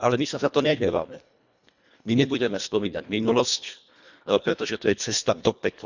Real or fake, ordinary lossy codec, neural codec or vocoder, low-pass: fake; none; codec, 24 kHz, 1.5 kbps, HILCodec; 7.2 kHz